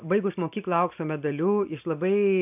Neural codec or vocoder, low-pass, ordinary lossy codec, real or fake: none; 3.6 kHz; AAC, 32 kbps; real